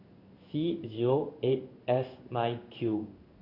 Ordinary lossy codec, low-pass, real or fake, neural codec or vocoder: Opus, 64 kbps; 5.4 kHz; fake; codec, 16 kHz in and 24 kHz out, 1 kbps, XY-Tokenizer